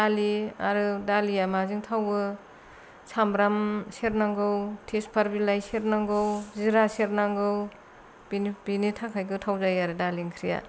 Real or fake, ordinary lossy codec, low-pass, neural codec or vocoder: real; none; none; none